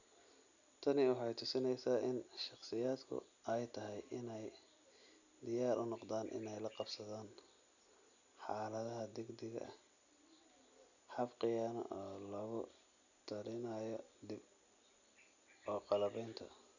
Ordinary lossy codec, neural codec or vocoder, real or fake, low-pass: none; none; real; 7.2 kHz